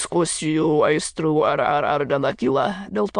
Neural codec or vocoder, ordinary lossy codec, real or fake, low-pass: autoencoder, 22.05 kHz, a latent of 192 numbers a frame, VITS, trained on many speakers; MP3, 64 kbps; fake; 9.9 kHz